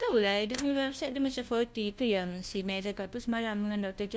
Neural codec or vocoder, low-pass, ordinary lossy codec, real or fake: codec, 16 kHz, 1 kbps, FunCodec, trained on LibriTTS, 50 frames a second; none; none; fake